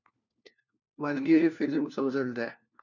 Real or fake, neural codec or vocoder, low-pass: fake; codec, 16 kHz, 1 kbps, FunCodec, trained on LibriTTS, 50 frames a second; 7.2 kHz